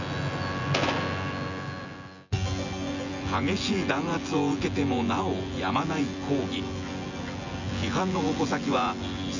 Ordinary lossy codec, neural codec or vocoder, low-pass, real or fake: none; vocoder, 24 kHz, 100 mel bands, Vocos; 7.2 kHz; fake